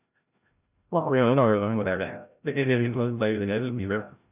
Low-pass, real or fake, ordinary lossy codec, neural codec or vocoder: 3.6 kHz; fake; none; codec, 16 kHz, 0.5 kbps, FreqCodec, larger model